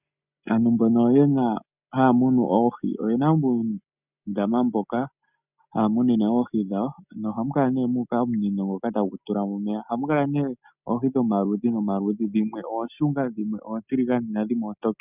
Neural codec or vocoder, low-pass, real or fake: none; 3.6 kHz; real